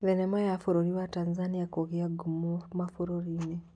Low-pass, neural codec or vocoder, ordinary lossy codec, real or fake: 9.9 kHz; none; none; real